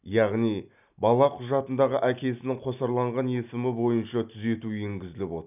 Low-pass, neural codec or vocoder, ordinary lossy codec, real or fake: 3.6 kHz; none; none; real